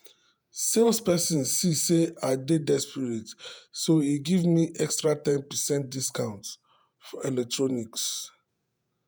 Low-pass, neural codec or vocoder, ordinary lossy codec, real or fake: none; vocoder, 48 kHz, 128 mel bands, Vocos; none; fake